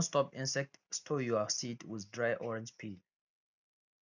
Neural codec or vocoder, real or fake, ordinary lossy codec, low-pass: none; real; none; 7.2 kHz